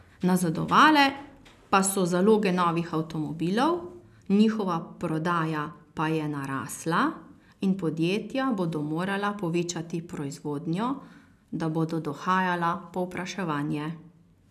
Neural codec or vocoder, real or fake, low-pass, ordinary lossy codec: none; real; 14.4 kHz; none